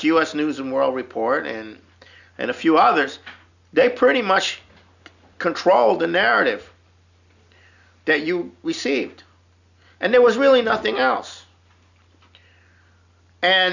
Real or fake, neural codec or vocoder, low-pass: real; none; 7.2 kHz